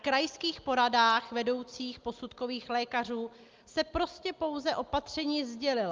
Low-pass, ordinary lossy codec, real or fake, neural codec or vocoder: 7.2 kHz; Opus, 32 kbps; real; none